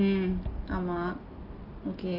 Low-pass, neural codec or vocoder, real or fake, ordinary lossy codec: 5.4 kHz; none; real; Opus, 24 kbps